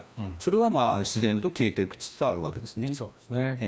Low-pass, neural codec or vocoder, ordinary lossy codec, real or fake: none; codec, 16 kHz, 1 kbps, FreqCodec, larger model; none; fake